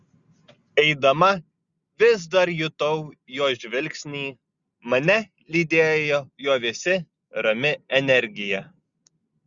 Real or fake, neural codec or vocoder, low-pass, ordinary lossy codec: real; none; 7.2 kHz; Opus, 64 kbps